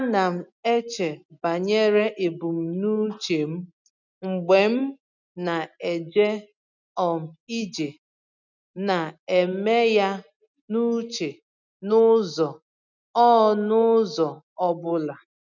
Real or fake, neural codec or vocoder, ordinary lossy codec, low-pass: real; none; none; 7.2 kHz